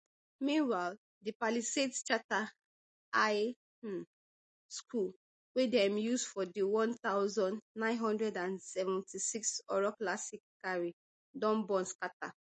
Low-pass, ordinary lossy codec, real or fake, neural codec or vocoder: 10.8 kHz; MP3, 32 kbps; real; none